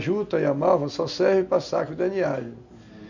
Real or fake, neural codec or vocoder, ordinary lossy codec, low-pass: real; none; none; 7.2 kHz